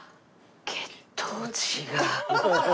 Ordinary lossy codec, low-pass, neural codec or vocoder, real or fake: none; none; none; real